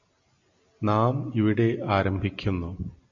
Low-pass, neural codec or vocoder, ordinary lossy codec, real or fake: 7.2 kHz; none; MP3, 96 kbps; real